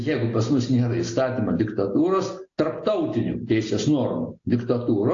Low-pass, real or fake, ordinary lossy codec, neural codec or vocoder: 7.2 kHz; real; AAC, 48 kbps; none